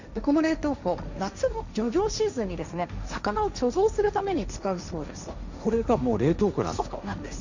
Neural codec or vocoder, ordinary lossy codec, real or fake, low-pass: codec, 16 kHz, 1.1 kbps, Voila-Tokenizer; none; fake; 7.2 kHz